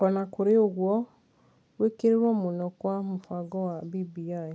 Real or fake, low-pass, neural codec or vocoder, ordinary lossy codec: real; none; none; none